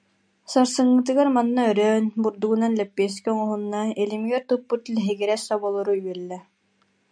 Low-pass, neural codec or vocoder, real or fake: 9.9 kHz; none; real